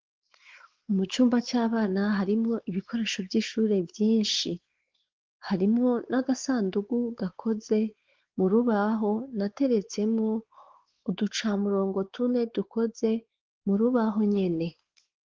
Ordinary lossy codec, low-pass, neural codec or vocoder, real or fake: Opus, 16 kbps; 7.2 kHz; codec, 16 kHz, 4 kbps, X-Codec, WavLM features, trained on Multilingual LibriSpeech; fake